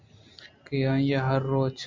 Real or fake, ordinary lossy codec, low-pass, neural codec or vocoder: real; MP3, 64 kbps; 7.2 kHz; none